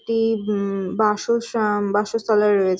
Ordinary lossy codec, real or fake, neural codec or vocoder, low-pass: none; real; none; none